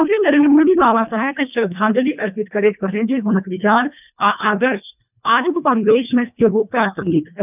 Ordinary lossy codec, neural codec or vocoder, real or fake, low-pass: none; codec, 24 kHz, 1.5 kbps, HILCodec; fake; 3.6 kHz